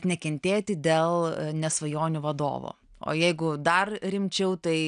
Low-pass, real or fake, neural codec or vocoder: 9.9 kHz; real; none